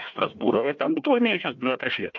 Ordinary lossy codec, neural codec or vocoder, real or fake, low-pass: MP3, 48 kbps; codec, 16 kHz, 1 kbps, FunCodec, trained on Chinese and English, 50 frames a second; fake; 7.2 kHz